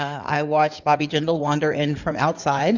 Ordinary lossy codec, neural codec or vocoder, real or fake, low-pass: Opus, 64 kbps; codec, 16 kHz in and 24 kHz out, 2.2 kbps, FireRedTTS-2 codec; fake; 7.2 kHz